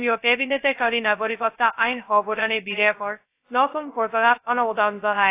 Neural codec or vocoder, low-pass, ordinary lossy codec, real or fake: codec, 16 kHz, 0.2 kbps, FocalCodec; 3.6 kHz; AAC, 24 kbps; fake